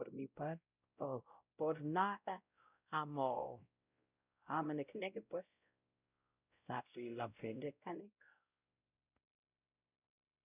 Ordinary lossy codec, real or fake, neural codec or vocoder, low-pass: none; fake; codec, 16 kHz, 0.5 kbps, X-Codec, WavLM features, trained on Multilingual LibriSpeech; 3.6 kHz